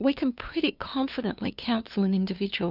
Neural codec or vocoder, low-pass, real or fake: codec, 16 kHz, 2 kbps, FunCodec, trained on LibriTTS, 25 frames a second; 5.4 kHz; fake